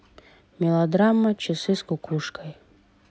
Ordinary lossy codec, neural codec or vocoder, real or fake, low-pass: none; none; real; none